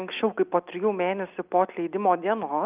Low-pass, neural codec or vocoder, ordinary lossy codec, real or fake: 3.6 kHz; none; Opus, 24 kbps; real